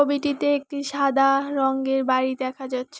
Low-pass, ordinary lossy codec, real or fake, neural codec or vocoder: none; none; real; none